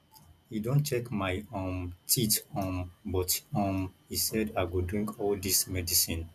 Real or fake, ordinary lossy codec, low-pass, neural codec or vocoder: real; none; 14.4 kHz; none